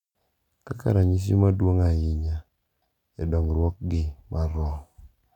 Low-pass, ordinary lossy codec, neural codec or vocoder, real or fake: 19.8 kHz; none; none; real